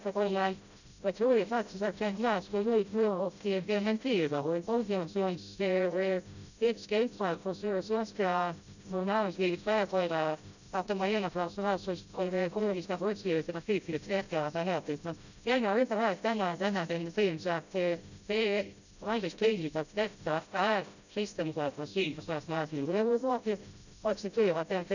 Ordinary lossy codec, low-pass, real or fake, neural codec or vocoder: none; 7.2 kHz; fake; codec, 16 kHz, 0.5 kbps, FreqCodec, smaller model